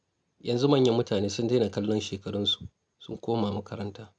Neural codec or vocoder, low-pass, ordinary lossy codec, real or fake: none; 9.9 kHz; none; real